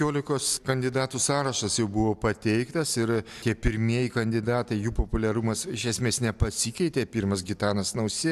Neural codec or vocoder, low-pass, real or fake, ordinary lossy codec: none; 14.4 kHz; real; AAC, 96 kbps